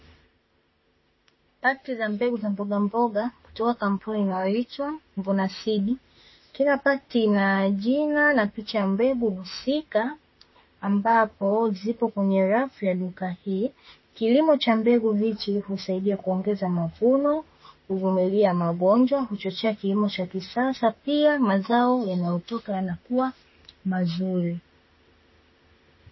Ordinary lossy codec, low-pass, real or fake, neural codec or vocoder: MP3, 24 kbps; 7.2 kHz; fake; autoencoder, 48 kHz, 32 numbers a frame, DAC-VAE, trained on Japanese speech